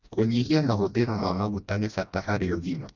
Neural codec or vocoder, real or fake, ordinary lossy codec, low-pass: codec, 16 kHz, 1 kbps, FreqCodec, smaller model; fake; none; 7.2 kHz